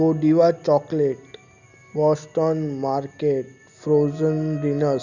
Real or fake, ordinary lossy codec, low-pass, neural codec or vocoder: real; none; 7.2 kHz; none